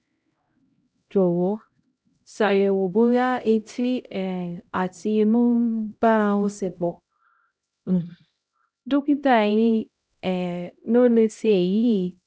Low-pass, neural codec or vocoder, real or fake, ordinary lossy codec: none; codec, 16 kHz, 0.5 kbps, X-Codec, HuBERT features, trained on LibriSpeech; fake; none